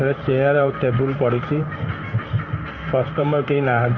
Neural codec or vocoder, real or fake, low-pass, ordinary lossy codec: codec, 16 kHz in and 24 kHz out, 1 kbps, XY-Tokenizer; fake; 7.2 kHz; none